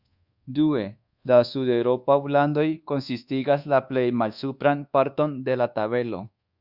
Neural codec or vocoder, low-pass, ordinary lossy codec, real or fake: codec, 24 kHz, 1.2 kbps, DualCodec; 5.4 kHz; Opus, 64 kbps; fake